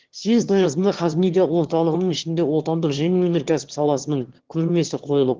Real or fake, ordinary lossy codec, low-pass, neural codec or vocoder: fake; Opus, 16 kbps; 7.2 kHz; autoencoder, 22.05 kHz, a latent of 192 numbers a frame, VITS, trained on one speaker